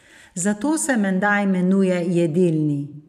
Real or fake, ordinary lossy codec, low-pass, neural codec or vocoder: fake; none; 14.4 kHz; vocoder, 44.1 kHz, 128 mel bands every 256 samples, BigVGAN v2